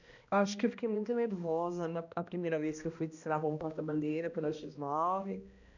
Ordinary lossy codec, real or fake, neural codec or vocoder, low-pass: none; fake; codec, 16 kHz, 1 kbps, X-Codec, HuBERT features, trained on balanced general audio; 7.2 kHz